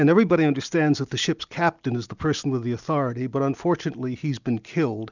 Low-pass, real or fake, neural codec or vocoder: 7.2 kHz; real; none